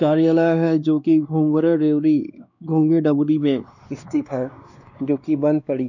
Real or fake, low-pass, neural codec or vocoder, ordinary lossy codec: fake; 7.2 kHz; codec, 16 kHz, 2 kbps, X-Codec, WavLM features, trained on Multilingual LibriSpeech; none